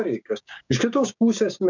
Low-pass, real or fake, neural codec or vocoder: 7.2 kHz; fake; codec, 16 kHz, 6 kbps, DAC